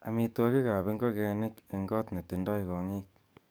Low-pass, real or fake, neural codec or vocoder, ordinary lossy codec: none; fake; codec, 44.1 kHz, 7.8 kbps, DAC; none